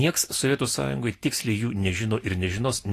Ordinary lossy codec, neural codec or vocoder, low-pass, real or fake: AAC, 48 kbps; none; 14.4 kHz; real